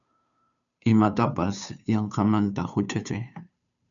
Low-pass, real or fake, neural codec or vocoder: 7.2 kHz; fake; codec, 16 kHz, 2 kbps, FunCodec, trained on Chinese and English, 25 frames a second